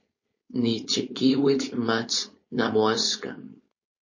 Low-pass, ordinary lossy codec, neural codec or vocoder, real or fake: 7.2 kHz; MP3, 32 kbps; codec, 16 kHz, 4.8 kbps, FACodec; fake